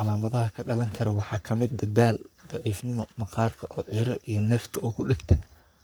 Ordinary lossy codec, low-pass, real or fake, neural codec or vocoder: none; none; fake; codec, 44.1 kHz, 3.4 kbps, Pupu-Codec